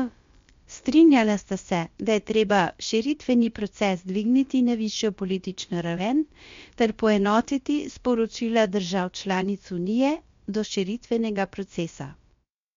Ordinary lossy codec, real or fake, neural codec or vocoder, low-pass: MP3, 48 kbps; fake; codec, 16 kHz, about 1 kbps, DyCAST, with the encoder's durations; 7.2 kHz